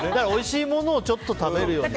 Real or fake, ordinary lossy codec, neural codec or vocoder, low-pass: real; none; none; none